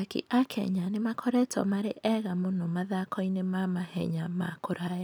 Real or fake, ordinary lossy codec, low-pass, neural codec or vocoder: real; none; none; none